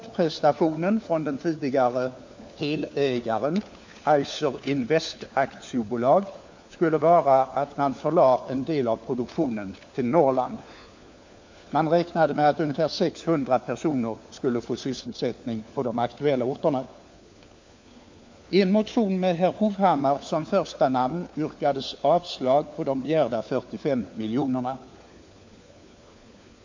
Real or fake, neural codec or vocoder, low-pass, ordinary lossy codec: fake; codec, 16 kHz, 4 kbps, FunCodec, trained on LibriTTS, 50 frames a second; 7.2 kHz; MP3, 48 kbps